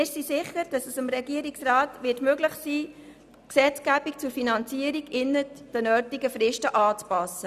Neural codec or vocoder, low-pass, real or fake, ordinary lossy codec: none; 14.4 kHz; real; none